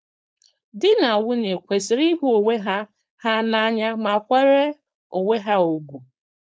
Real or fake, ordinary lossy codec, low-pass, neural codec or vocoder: fake; none; none; codec, 16 kHz, 4.8 kbps, FACodec